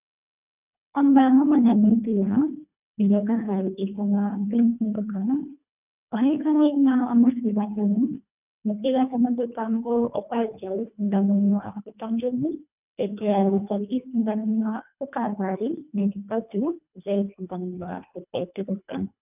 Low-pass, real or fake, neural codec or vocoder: 3.6 kHz; fake; codec, 24 kHz, 1.5 kbps, HILCodec